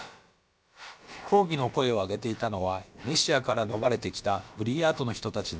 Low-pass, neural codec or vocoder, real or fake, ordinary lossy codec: none; codec, 16 kHz, about 1 kbps, DyCAST, with the encoder's durations; fake; none